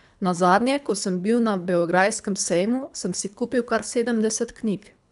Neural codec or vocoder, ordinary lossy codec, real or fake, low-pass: codec, 24 kHz, 3 kbps, HILCodec; none; fake; 10.8 kHz